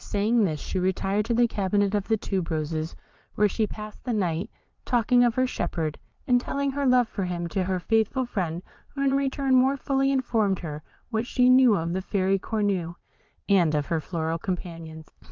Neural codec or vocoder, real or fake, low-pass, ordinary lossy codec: vocoder, 22.05 kHz, 80 mel bands, Vocos; fake; 7.2 kHz; Opus, 32 kbps